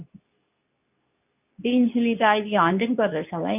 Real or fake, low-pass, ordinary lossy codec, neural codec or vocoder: fake; 3.6 kHz; none; codec, 24 kHz, 0.9 kbps, WavTokenizer, medium speech release version 2